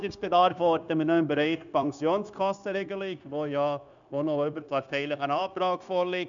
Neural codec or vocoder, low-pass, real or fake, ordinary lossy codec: codec, 16 kHz, 0.9 kbps, LongCat-Audio-Codec; 7.2 kHz; fake; none